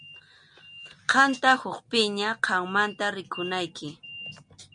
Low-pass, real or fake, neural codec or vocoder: 9.9 kHz; real; none